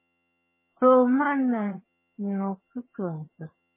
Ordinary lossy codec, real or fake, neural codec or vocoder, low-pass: MP3, 16 kbps; fake; vocoder, 22.05 kHz, 80 mel bands, HiFi-GAN; 3.6 kHz